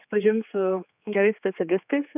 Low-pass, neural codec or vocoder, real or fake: 3.6 kHz; codec, 16 kHz, 4 kbps, X-Codec, HuBERT features, trained on general audio; fake